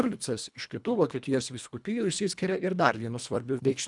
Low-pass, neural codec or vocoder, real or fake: 10.8 kHz; codec, 24 kHz, 1.5 kbps, HILCodec; fake